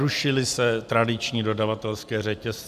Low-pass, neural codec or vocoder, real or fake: 14.4 kHz; none; real